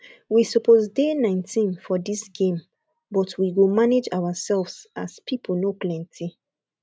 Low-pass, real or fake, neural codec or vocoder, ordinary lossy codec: none; real; none; none